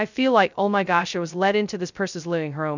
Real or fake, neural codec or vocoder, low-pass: fake; codec, 16 kHz, 0.2 kbps, FocalCodec; 7.2 kHz